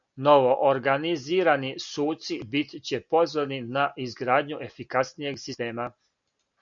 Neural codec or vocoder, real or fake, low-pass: none; real; 7.2 kHz